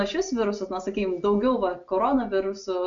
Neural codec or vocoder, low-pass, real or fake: none; 7.2 kHz; real